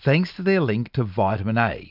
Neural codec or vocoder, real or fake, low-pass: none; real; 5.4 kHz